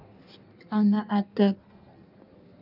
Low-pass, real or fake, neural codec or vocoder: 5.4 kHz; fake; codec, 16 kHz in and 24 kHz out, 1.1 kbps, FireRedTTS-2 codec